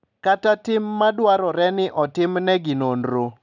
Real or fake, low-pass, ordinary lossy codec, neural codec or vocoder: real; 7.2 kHz; none; none